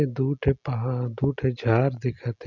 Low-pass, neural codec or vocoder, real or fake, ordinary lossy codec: 7.2 kHz; none; real; none